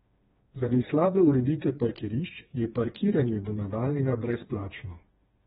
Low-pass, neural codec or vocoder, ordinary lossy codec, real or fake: 7.2 kHz; codec, 16 kHz, 2 kbps, FreqCodec, smaller model; AAC, 16 kbps; fake